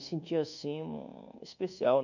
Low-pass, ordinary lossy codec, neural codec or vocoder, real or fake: 7.2 kHz; none; codec, 24 kHz, 1.2 kbps, DualCodec; fake